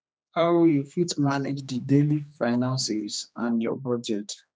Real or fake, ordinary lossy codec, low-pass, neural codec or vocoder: fake; none; none; codec, 16 kHz, 2 kbps, X-Codec, HuBERT features, trained on general audio